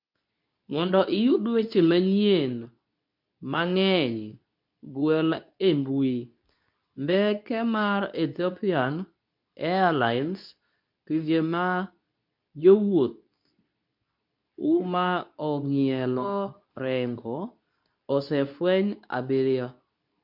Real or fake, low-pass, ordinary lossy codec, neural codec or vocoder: fake; 5.4 kHz; MP3, 48 kbps; codec, 24 kHz, 0.9 kbps, WavTokenizer, medium speech release version 2